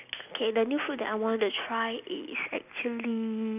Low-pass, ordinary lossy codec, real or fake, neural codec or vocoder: 3.6 kHz; none; real; none